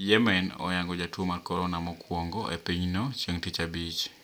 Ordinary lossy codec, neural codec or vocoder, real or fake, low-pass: none; none; real; none